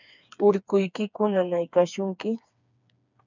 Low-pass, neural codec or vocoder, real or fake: 7.2 kHz; codec, 16 kHz, 4 kbps, FreqCodec, smaller model; fake